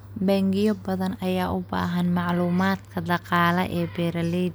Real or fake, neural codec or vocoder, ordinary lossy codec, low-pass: real; none; none; none